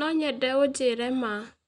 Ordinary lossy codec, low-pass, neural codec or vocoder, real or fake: none; 10.8 kHz; none; real